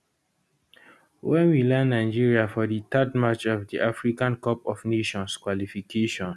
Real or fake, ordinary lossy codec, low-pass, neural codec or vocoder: real; none; none; none